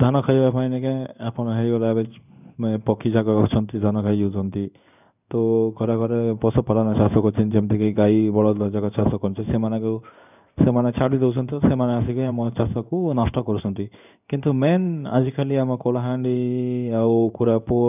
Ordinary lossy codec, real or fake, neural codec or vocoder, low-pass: none; fake; codec, 16 kHz in and 24 kHz out, 1 kbps, XY-Tokenizer; 3.6 kHz